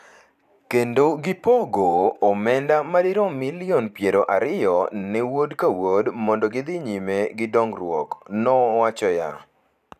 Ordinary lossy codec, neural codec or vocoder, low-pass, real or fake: none; vocoder, 44.1 kHz, 128 mel bands every 512 samples, BigVGAN v2; 14.4 kHz; fake